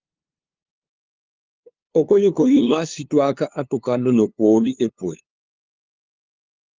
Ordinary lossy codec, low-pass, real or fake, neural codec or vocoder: Opus, 32 kbps; 7.2 kHz; fake; codec, 16 kHz, 2 kbps, FunCodec, trained on LibriTTS, 25 frames a second